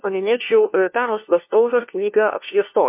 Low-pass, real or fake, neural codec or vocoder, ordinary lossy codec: 3.6 kHz; fake; codec, 16 kHz, 1 kbps, FunCodec, trained on LibriTTS, 50 frames a second; MP3, 32 kbps